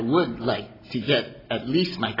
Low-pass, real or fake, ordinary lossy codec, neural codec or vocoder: 5.4 kHz; real; AAC, 24 kbps; none